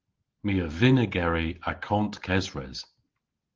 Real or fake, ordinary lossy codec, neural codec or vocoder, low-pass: real; Opus, 16 kbps; none; 7.2 kHz